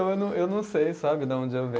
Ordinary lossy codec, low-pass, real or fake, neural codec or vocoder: none; none; real; none